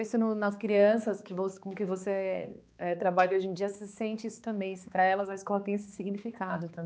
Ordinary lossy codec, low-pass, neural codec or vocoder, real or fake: none; none; codec, 16 kHz, 2 kbps, X-Codec, HuBERT features, trained on balanced general audio; fake